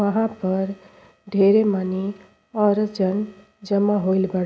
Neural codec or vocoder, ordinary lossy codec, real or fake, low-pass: none; none; real; none